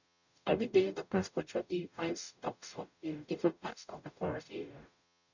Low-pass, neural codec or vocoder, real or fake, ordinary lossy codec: 7.2 kHz; codec, 44.1 kHz, 0.9 kbps, DAC; fake; none